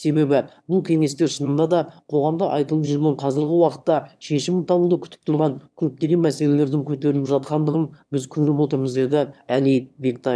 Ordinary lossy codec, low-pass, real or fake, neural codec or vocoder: none; none; fake; autoencoder, 22.05 kHz, a latent of 192 numbers a frame, VITS, trained on one speaker